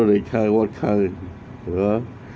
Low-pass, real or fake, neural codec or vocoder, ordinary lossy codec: none; real; none; none